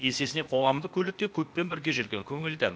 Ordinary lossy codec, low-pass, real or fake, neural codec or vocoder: none; none; fake; codec, 16 kHz, 0.8 kbps, ZipCodec